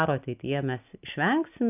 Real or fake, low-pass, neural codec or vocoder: real; 3.6 kHz; none